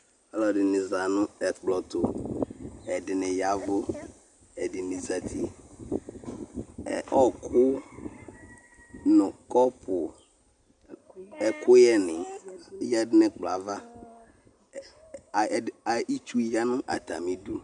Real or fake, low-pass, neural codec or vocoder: real; 10.8 kHz; none